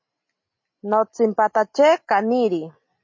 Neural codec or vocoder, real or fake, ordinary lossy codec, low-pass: none; real; MP3, 32 kbps; 7.2 kHz